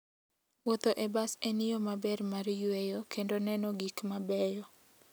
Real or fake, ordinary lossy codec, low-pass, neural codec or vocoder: real; none; none; none